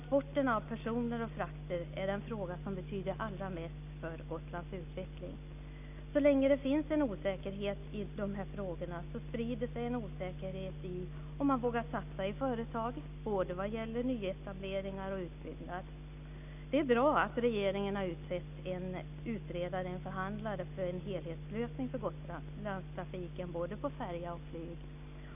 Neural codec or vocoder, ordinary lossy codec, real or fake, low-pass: none; none; real; 3.6 kHz